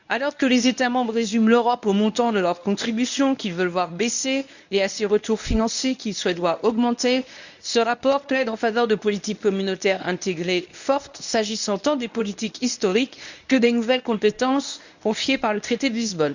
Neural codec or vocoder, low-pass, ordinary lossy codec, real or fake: codec, 24 kHz, 0.9 kbps, WavTokenizer, medium speech release version 1; 7.2 kHz; none; fake